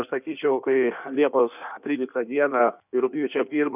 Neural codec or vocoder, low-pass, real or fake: codec, 16 kHz in and 24 kHz out, 1.1 kbps, FireRedTTS-2 codec; 3.6 kHz; fake